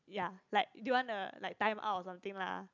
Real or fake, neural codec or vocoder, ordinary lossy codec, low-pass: real; none; none; 7.2 kHz